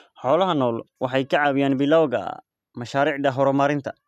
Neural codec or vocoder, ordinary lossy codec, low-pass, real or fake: none; none; 14.4 kHz; real